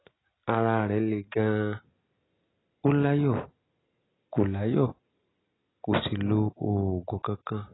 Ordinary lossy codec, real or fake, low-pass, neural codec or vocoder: AAC, 16 kbps; real; 7.2 kHz; none